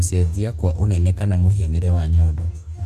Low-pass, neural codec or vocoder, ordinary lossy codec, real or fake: 14.4 kHz; codec, 44.1 kHz, 2.6 kbps, DAC; AAC, 96 kbps; fake